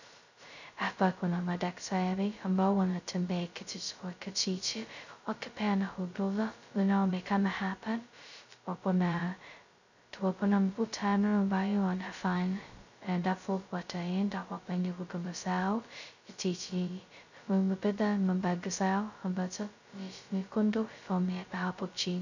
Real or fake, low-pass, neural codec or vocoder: fake; 7.2 kHz; codec, 16 kHz, 0.2 kbps, FocalCodec